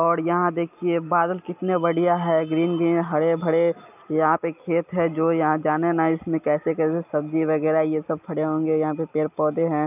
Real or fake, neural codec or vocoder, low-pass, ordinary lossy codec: real; none; 3.6 kHz; none